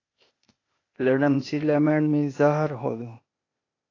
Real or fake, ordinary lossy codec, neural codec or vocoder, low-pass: fake; AAC, 32 kbps; codec, 16 kHz, 0.8 kbps, ZipCodec; 7.2 kHz